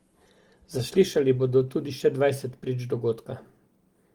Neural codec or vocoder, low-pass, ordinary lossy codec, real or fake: none; 19.8 kHz; Opus, 24 kbps; real